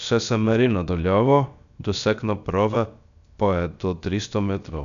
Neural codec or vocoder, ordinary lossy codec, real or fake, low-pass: codec, 16 kHz, about 1 kbps, DyCAST, with the encoder's durations; none; fake; 7.2 kHz